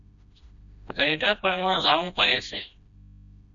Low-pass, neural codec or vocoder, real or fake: 7.2 kHz; codec, 16 kHz, 1 kbps, FreqCodec, smaller model; fake